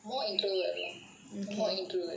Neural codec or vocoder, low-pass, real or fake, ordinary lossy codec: none; none; real; none